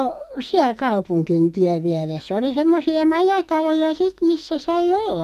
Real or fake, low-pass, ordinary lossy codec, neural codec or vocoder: fake; 14.4 kHz; MP3, 96 kbps; codec, 44.1 kHz, 2.6 kbps, SNAC